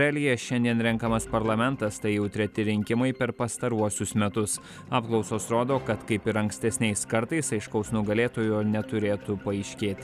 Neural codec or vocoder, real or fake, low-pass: none; real; 14.4 kHz